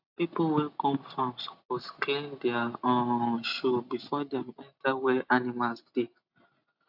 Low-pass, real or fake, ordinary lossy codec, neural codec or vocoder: 5.4 kHz; real; AAC, 48 kbps; none